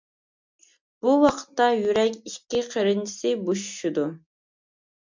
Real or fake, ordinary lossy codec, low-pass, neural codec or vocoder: real; MP3, 64 kbps; 7.2 kHz; none